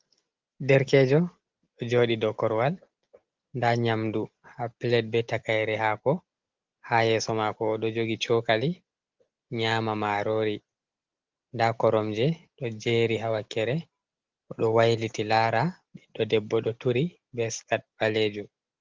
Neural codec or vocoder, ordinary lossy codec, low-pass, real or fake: none; Opus, 16 kbps; 7.2 kHz; real